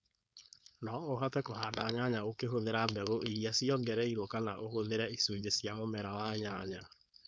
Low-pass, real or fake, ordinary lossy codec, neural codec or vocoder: none; fake; none; codec, 16 kHz, 4.8 kbps, FACodec